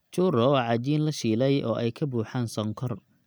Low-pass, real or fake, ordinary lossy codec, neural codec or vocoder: none; real; none; none